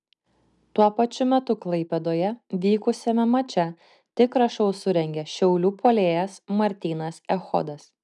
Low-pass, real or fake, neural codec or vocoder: 10.8 kHz; real; none